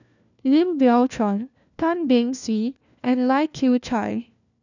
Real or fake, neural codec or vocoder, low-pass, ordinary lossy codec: fake; codec, 16 kHz, 1 kbps, FunCodec, trained on LibriTTS, 50 frames a second; 7.2 kHz; none